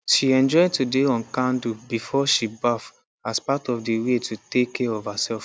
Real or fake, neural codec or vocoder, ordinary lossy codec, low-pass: real; none; none; none